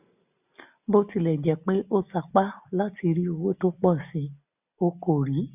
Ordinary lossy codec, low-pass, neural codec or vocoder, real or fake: none; 3.6 kHz; none; real